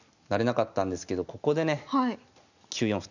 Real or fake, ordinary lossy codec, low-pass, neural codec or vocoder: real; none; 7.2 kHz; none